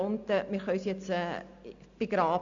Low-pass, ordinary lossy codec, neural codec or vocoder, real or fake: 7.2 kHz; AAC, 64 kbps; none; real